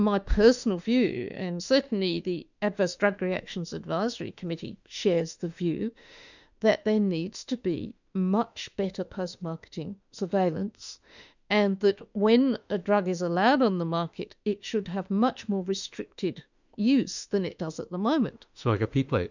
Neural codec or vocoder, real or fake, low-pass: autoencoder, 48 kHz, 32 numbers a frame, DAC-VAE, trained on Japanese speech; fake; 7.2 kHz